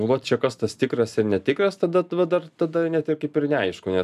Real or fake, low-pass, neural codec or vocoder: real; 14.4 kHz; none